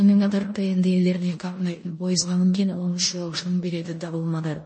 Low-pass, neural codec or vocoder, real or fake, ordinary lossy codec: 9.9 kHz; codec, 16 kHz in and 24 kHz out, 0.9 kbps, LongCat-Audio-Codec, four codebook decoder; fake; MP3, 32 kbps